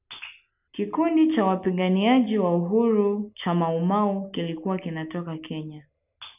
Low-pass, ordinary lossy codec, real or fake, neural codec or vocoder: 3.6 kHz; none; real; none